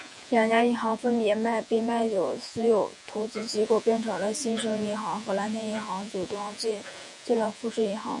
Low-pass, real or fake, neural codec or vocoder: 10.8 kHz; fake; vocoder, 48 kHz, 128 mel bands, Vocos